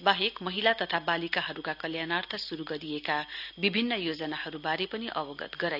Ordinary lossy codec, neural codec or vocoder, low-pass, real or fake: none; none; 5.4 kHz; real